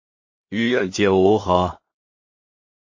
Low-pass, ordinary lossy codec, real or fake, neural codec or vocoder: 7.2 kHz; MP3, 32 kbps; fake; codec, 16 kHz in and 24 kHz out, 0.4 kbps, LongCat-Audio-Codec, two codebook decoder